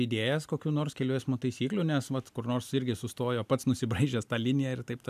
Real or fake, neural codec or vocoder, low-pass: real; none; 14.4 kHz